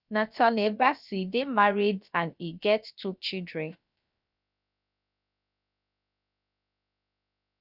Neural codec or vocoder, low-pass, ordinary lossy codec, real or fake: codec, 16 kHz, about 1 kbps, DyCAST, with the encoder's durations; 5.4 kHz; none; fake